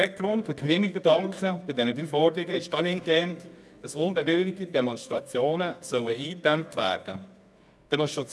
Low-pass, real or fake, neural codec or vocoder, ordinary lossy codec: none; fake; codec, 24 kHz, 0.9 kbps, WavTokenizer, medium music audio release; none